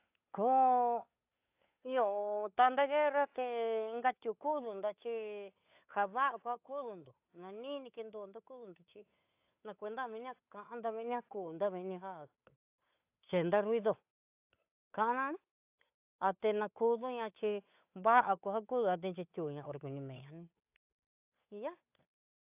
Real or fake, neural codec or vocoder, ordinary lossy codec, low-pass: fake; codec, 16 kHz, 8 kbps, FunCodec, trained on Chinese and English, 25 frames a second; AAC, 32 kbps; 3.6 kHz